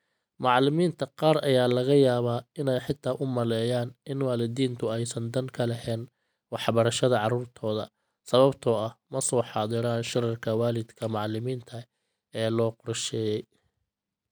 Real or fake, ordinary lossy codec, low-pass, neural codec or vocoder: real; none; none; none